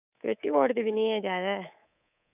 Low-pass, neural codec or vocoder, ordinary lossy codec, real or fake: 3.6 kHz; codec, 24 kHz, 3.1 kbps, DualCodec; none; fake